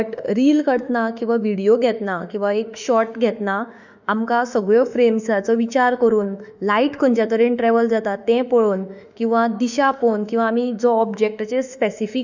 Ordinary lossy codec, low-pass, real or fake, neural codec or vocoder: none; 7.2 kHz; fake; autoencoder, 48 kHz, 32 numbers a frame, DAC-VAE, trained on Japanese speech